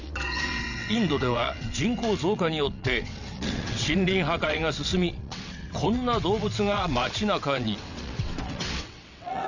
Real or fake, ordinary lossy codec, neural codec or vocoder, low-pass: fake; none; vocoder, 22.05 kHz, 80 mel bands, WaveNeXt; 7.2 kHz